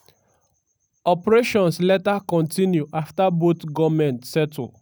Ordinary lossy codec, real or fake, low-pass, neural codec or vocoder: none; real; none; none